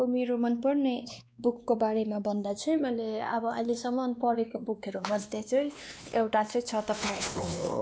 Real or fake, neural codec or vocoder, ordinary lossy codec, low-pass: fake; codec, 16 kHz, 2 kbps, X-Codec, WavLM features, trained on Multilingual LibriSpeech; none; none